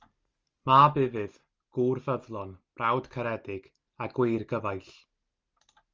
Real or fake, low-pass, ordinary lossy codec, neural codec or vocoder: real; 7.2 kHz; Opus, 24 kbps; none